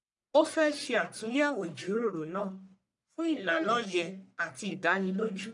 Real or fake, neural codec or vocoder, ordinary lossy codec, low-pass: fake; codec, 44.1 kHz, 1.7 kbps, Pupu-Codec; none; 10.8 kHz